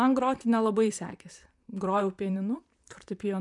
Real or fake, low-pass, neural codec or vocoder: fake; 10.8 kHz; vocoder, 44.1 kHz, 128 mel bands, Pupu-Vocoder